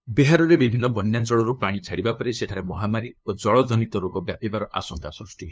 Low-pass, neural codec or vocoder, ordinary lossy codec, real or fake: none; codec, 16 kHz, 2 kbps, FunCodec, trained on LibriTTS, 25 frames a second; none; fake